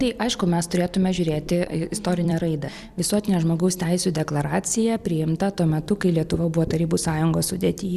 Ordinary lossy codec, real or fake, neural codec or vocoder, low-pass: Opus, 64 kbps; fake; vocoder, 44.1 kHz, 128 mel bands every 256 samples, BigVGAN v2; 14.4 kHz